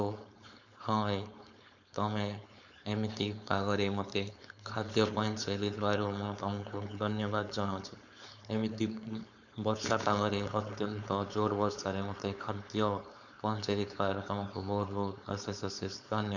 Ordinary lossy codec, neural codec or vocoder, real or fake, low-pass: none; codec, 16 kHz, 4.8 kbps, FACodec; fake; 7.2 kHz